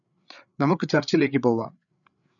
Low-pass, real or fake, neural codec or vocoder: 7.2 kHz; fake; codec, 16 kHz, 8 kbps, FreqCodec, larger model